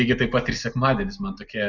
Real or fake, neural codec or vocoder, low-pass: real; none; 7.2 kHz